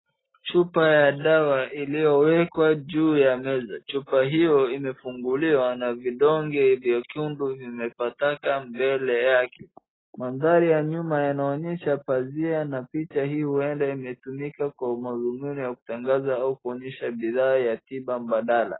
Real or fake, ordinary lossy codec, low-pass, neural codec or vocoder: real; AAC, 16 kbps; 7.2 kHz; none